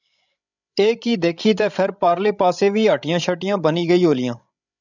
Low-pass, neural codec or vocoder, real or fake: 7.2 kHz; codec, 16 kHz, 16 kbps, FreqCodec, larger model; fake